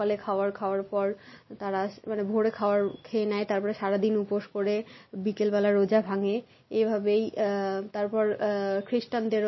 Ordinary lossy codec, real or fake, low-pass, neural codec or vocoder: MP3, 24 kbps; real; 7.2 kHz; none